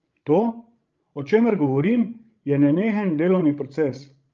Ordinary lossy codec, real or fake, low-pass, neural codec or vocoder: Opus, 24 kbps; fake; 7.2 kHz; codec, 16 kHz, 16 kbps, FreqCodec, larger model